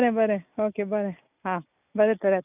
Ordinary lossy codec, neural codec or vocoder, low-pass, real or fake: none; none; 3.6 kHz; real